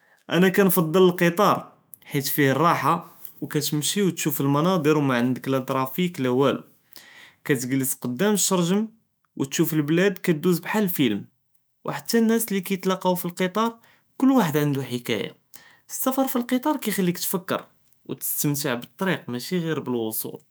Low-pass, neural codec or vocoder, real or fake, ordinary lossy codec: none; autoencoder, 48 kHz, 128 numbers a frame, DAC-VAE, trained on Japanese speech; fake; none